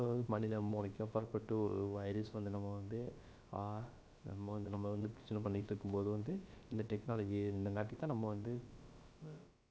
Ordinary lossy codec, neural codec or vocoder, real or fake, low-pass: none; codec, 16 kHz, about 1 kbps, DyCAST, with the encoder's durations; fake; none